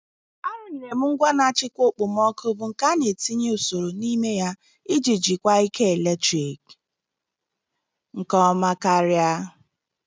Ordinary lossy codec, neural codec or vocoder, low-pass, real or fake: none; none; none; real